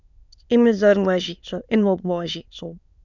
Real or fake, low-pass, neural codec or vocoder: fake; 7.2 kHz; autoencoder, 22.05 kHz, a latent of 192 numbers a frame, VITS, trained on many speakers